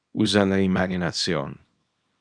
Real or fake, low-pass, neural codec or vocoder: fake; 9.9 kHz; codec, 24 kHz, 0.9 kbps, WavTokenizer, small release